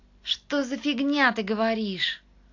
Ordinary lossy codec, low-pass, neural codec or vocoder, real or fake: AAC, 48 kbps; 7.2 kHz; none; real